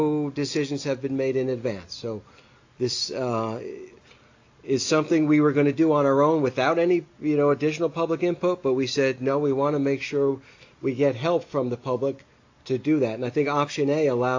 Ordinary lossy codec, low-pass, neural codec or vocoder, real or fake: AAC, 48 kbps; 7.2 kHz; none; real